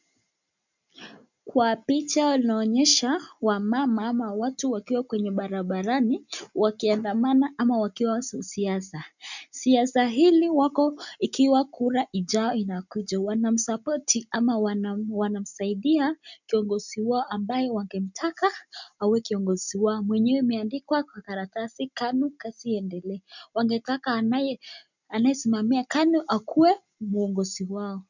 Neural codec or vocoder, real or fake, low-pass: none; real; 7.2 kHz